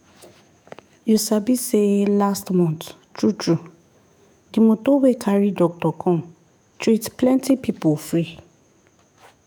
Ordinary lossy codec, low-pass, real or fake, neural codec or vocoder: none; none; fake; autoencoder, 48 kHz, 128 numbers a frame, DAC-VAE, trained on Japanese speech